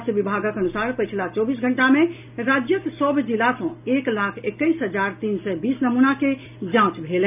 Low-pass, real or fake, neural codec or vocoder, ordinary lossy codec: 3.6 kHz; real; none; none